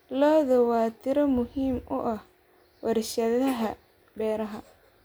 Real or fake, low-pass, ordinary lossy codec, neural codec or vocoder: real; none; none; none